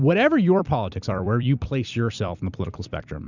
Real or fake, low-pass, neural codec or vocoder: fake; 7.2 kHz; vocoder, 44.1 kHz, 128 mel bands every 256 samples, BigVGAN v2